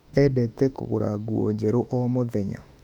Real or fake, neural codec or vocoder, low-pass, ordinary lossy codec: fake; autoencoder, 48 kHz, 32 numbers a frame, DAC-VAE, trained on Japanese speech; 19.8 kHz; none